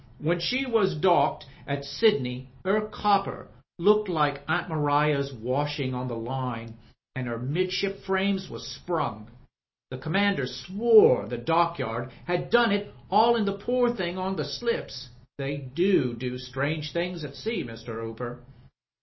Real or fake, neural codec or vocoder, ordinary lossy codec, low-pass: real; none; MP3, 24 kbps; 7.2 kHz